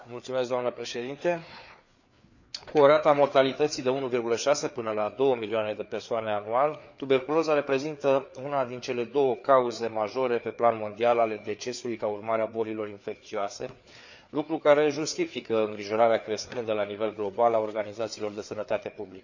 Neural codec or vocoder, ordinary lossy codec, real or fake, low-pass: codec, 16 kHz, 4 kbps, FreqCodec, larger model; none; fake; 7.2 kHz